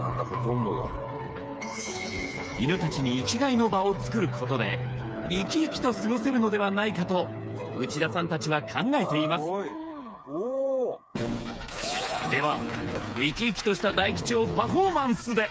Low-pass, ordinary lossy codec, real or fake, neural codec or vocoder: none; none; fake; codec, 16 kHz, 4 kbps, FreqCodec, smaller model